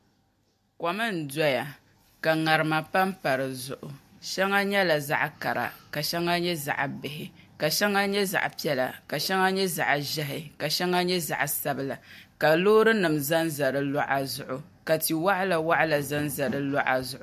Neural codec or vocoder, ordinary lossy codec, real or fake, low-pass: none; AAC, 96 kbps; real; 14.4 kHz